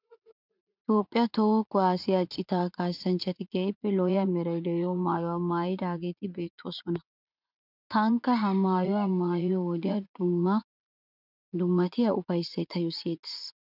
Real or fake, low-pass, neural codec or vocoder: fake; 5.4 kHz; vocoder, 44.1 kHz, 80 mel bands, Vocos